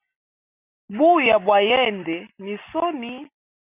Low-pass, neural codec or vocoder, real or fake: 3.6 kHz; none; real